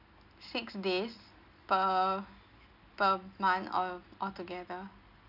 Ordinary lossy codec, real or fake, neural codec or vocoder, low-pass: none; real; none; 5.4 kHz